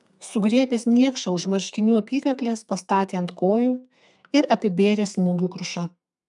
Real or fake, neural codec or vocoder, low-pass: fake; codec, 32 kHz, 1.9 kbps, SNAC; 10.8 kHz